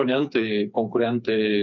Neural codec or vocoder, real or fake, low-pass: codec, 16 kHz, 4 kbps, FreqCodec, smaller model; fake; 7.2 kHz